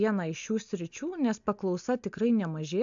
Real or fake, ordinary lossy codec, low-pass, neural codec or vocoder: real; AAC, 64 kbps; 7.2 kHz; none